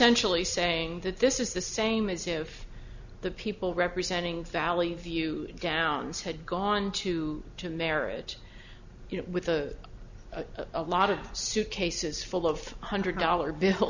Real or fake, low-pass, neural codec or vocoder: real; 7.2 kHz; none